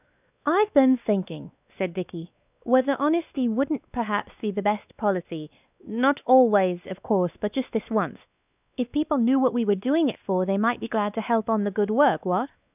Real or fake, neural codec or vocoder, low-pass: fake; codec, 16 kHz, 2 kbps, X-Codec, WavLM features, trained on Multilingual LibriSpeech; 3.6 kHz